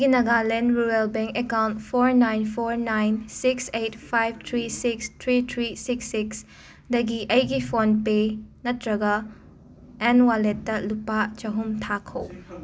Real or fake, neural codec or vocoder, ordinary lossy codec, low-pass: real; none; none; none